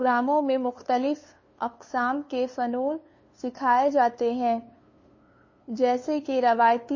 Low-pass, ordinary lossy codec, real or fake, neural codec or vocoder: 7.2 kHz; MP3, 32 kbps; fake; codec, 16 kHz, 2 kbps, FunCodec, trained on LibriTTS, 25 frames a second